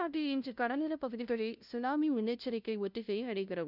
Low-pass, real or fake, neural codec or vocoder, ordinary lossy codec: 5.4 kHz; fake; codec, 16 kHz, 0.5 kbps, FunCodec, trained on LibriTTS, 25 frames a second; none